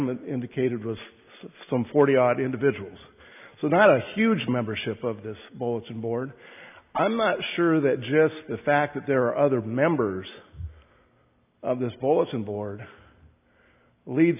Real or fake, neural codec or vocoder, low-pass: real; none; 3.6 kHz